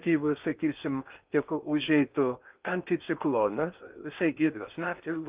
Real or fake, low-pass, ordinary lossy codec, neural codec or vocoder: fake; 3.6 kHz; Opus, 24 kbps; codec, 16 kHz in and 24 kHz out, 0.8 kbps, FocalCodec, streaming, 65536 codes